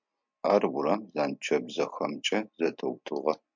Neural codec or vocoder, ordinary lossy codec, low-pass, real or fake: none; MP3, 64 kbps; 7.2 kHz; real